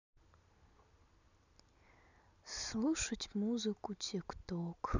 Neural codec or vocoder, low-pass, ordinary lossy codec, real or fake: none; 7.2 kHz; none; real